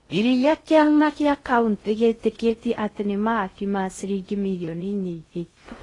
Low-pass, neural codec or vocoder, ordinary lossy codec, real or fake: 10.8 kHz; codec, 16 kHz in and 24 kHz out, 0.6 kbps, FocalCodec, streaming, 4096 codes; AAC, 32 kbps; fake